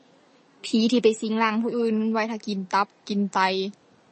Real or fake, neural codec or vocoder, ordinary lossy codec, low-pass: real; none; MP3, 32 kbps; 10.8 kHz